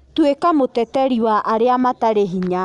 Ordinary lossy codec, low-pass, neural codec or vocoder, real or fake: none; 10.8 kHz; none; real